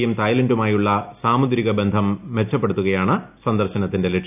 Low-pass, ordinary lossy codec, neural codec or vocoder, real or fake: 3.6 kHz; none; none; real